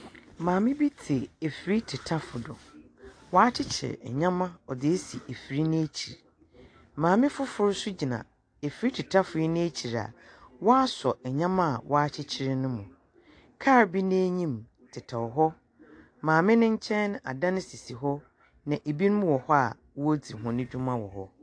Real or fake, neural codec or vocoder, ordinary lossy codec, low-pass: real; none; AAC, 48 kbps; 9.9 kHz